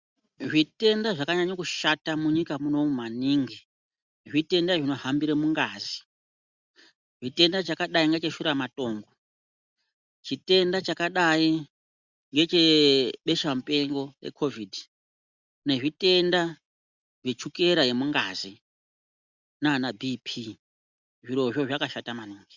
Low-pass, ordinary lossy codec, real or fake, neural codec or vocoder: 7.2 kHz; Opus, 64 kbps; real; none